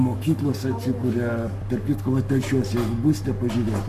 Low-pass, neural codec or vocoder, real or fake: 14.4 kHz; codec, 44.1 kHz, 7.8 kbps, Pupu-Codec; fake